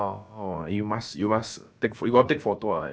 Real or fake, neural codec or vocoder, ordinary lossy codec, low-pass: fake; codec, 16 kHz, about 1 kbps, DyCAST, with the encoder's durations; none; none